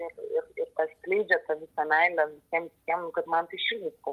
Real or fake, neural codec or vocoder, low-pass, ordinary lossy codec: real; none; 14.4 kHz; Opus, 24 kbps